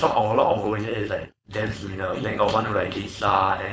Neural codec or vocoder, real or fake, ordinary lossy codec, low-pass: codec, 16 kHz, 4.8 kbps, FACodec; fake; none; none